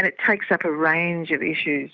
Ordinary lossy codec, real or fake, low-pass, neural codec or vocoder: Opus, 64 kbps; real; 7.2 kHz; none